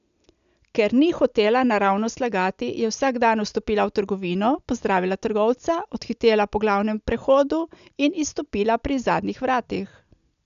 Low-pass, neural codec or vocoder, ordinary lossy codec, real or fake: 7.2 kHz; none; none; real